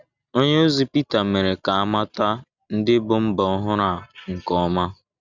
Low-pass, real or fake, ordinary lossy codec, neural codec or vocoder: 7.2 kHz; real; none; none